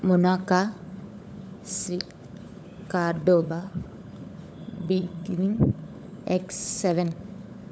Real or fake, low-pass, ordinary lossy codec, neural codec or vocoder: fake; none; none; codec, 16 kHz, 16 kbps, FunCodec, trained on LibriTTS, 50 frames a second